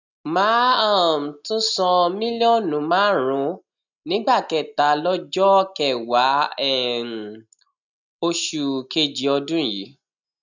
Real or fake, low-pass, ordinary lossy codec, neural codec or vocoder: real; 7.2 kHz; none; none